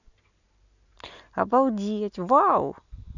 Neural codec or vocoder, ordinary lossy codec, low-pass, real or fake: none; none; 7.2 kHz; real